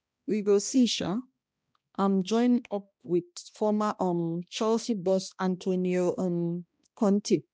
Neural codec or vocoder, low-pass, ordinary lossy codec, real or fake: codec, 16 kHz, 1 kbps, X-Codec, HuBERT features, trained on balanced general audio; none; none; fake